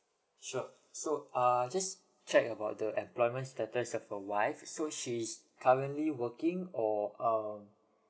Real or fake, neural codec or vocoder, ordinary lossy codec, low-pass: real; none; none; none